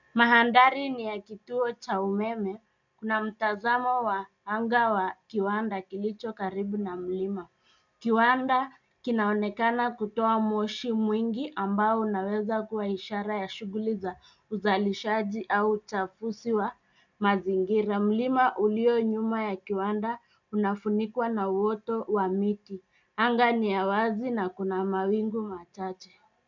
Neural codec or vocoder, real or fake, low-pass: none; real; 7.2 kHz